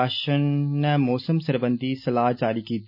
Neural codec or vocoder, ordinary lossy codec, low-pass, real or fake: none; none; 5.4 kHz; real